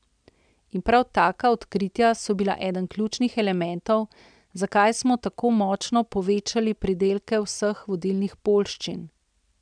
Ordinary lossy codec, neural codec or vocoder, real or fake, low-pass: none; none; real; 9.9 kHz